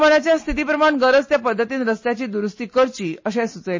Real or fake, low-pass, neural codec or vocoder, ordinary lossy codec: real; 7.2 kHz; none; MP3, 32 kbps